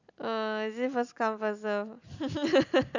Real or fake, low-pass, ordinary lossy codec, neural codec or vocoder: real; 7.2 kHz; none; none